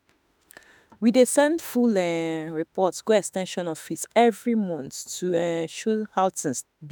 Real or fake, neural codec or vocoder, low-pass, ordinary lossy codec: fake; autoencoder, 48 kHz, 32 numbers a frame, DAC-VAE, trained on Japanese speech; none; none